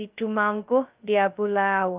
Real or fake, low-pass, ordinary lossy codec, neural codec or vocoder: fake; 3.6 kHz; Opus, 32 kbps; codec, 16 kHz, 0.2 kbps, FocalCodec